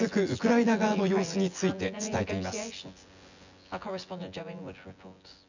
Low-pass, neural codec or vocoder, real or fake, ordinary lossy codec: 7.2 kHz; vocoder, 24 kHz, 100 mel bands, Vocos; fake; none